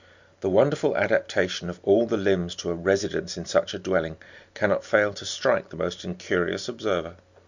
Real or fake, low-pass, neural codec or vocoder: real; 7.2 kHz; none